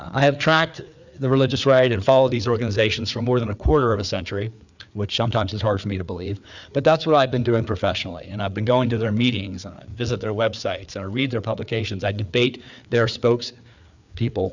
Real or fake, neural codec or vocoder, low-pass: fake; codec, 16 kHz, 4 kbps, FreqCodec, larger model; 7.2 kHz